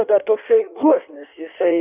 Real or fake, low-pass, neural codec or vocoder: fake; 3.6 kHz; codec, 16 kHz in and 24 kHz out, 1.1 kbps, FireRedTTS-2 codec